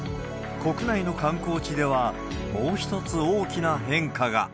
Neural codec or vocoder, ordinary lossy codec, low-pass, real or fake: none; none; none; real